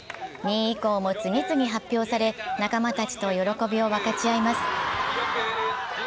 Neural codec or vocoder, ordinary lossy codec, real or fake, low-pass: none; none; real; none